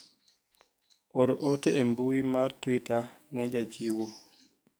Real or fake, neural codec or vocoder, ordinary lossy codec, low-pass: fake; codec, 44.1 kHz, 2.6 kbps, SNAC; none; none